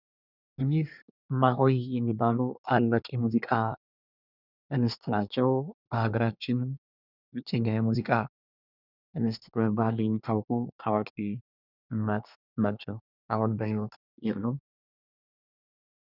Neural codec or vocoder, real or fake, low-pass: codec, 24 kHz, 1 kbps, SNAC; fake; 5.4 kHz